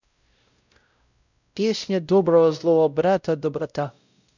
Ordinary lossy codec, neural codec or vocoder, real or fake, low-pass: none; codec, 16 kHz, 0.5 kbps, X-Codec, WavLM features, trained on Multilingual LibriSpeech; fake; 7.2 kHz